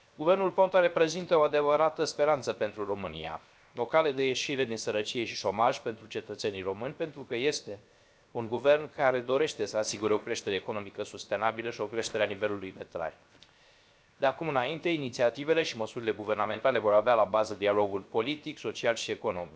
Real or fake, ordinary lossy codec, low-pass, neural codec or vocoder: fake; none; none; codec, 16 kHz, 0.7 kbps, FocalCodec